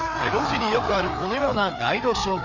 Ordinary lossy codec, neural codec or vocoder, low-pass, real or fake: none; codec, 16 kHz, 4 kbps, FreqCodec, larger model; 7.2 kHz; fake